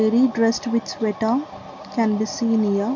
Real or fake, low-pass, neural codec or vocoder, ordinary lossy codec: real; 7.2 kHz; none; MP3, 64 kbps